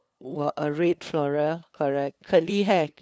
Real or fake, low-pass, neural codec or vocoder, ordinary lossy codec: fake; none; codec, 16 kHz, 2 kbps, FunCodec, trained on LibriTTS, 25 frames a second; none